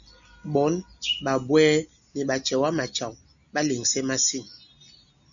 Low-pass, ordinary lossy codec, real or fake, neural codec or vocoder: 7.2 kHz; MP3, 96 kbps; real; none